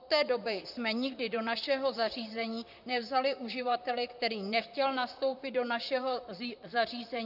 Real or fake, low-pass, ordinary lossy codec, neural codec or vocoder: fake; 5.4 kHz; AAC, 48 kbps; vocoder, 44.1 kHz, 128 mel bands, Pupu-Vocoder